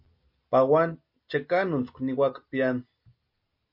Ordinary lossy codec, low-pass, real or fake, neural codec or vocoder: MP3, 32 kbps; 5.4 kHz; real; none